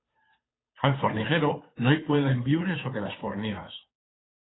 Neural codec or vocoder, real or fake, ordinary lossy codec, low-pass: codec, 16 kHz, 2 kbps, FunCodec, trained on Chinese and English, 25 frames a second; fake; AAC, 16 kbps; 7.2 kHz